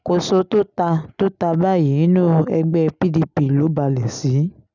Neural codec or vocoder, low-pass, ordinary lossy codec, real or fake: none; 7.2 kHz; none; real